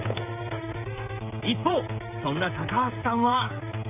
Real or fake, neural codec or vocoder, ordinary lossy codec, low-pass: fake; codec, 16 kHz, 8 kbps, FreqCodec, smaller model; AAC, 32 kbps; 3.6 kHz